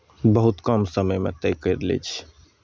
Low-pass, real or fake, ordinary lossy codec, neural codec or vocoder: none; real; none; none